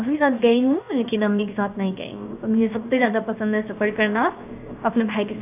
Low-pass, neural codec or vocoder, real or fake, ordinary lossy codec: 3.6 kHz; codec, 16 kHz, 0.7 kbps, FocalCodec; fake; AAC, 32 kbps